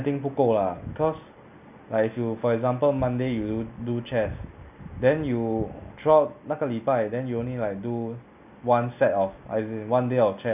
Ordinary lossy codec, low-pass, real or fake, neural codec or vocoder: none; 3.6 kHz; real; none